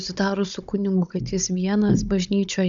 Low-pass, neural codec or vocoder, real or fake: 7.2 kHz; codec, 16 kHz, 16 kbps, FunCodec, trained on Chinese and English, 50 frames a second; fake